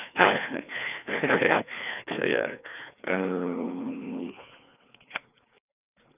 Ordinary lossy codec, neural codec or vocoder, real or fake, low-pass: none; codec, 16 kHz, 2 kbps, FreqCodec, larger model; fake; 3.6 kHz